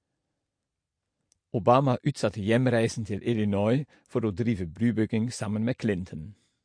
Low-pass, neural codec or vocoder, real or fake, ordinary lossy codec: 9.9 kHz; none; real; MP3, 48 kbps